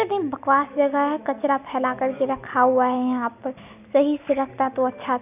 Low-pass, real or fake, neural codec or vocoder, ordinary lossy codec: 3.6 kHz; real; none; none